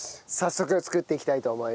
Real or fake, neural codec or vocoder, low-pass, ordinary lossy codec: real; none; none; none